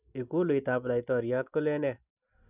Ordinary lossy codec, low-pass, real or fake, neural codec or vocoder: none; 3.6 kHz; real; none